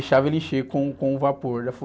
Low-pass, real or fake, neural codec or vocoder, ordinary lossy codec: none; real; none; none